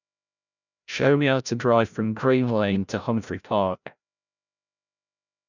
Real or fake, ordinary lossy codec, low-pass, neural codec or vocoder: fake; none; 7.2 kHz; codec, 16 kHz, 0.5 kbps, FreqCodec, larger model